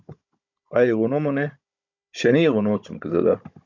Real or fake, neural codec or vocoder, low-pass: fake; codec, 16 kHz, 16 kbps, FunCodec, trained on Chinese and English, 50 frames a second; 7.2 kHz